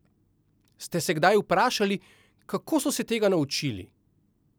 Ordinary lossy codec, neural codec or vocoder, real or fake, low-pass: none; none; real; none